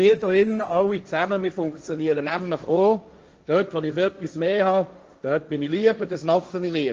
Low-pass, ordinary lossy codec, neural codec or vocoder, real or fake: 7.2 kHz; Opus, 24 kbps; codec, 16 kHz, 1.1 kbps, Voila-Tokenizer; fake